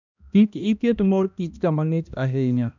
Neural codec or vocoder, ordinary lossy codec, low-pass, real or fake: codec, 16 kHz, 1 kbps, X-Codec, HuBERT features, trained on balanced general audio; none; 7.2 kHz; fake